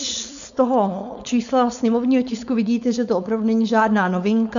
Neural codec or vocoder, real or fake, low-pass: codec, 16 kHz, 4.8 kbps, FACodec; fake; 7.2 kHz